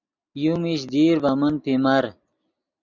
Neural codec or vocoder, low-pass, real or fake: none; 7.2 kHz; real